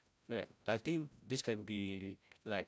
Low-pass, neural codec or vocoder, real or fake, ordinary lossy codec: none; codec, 16 kHz, 0.5 kbps, FreqCodec, larger model; fake; none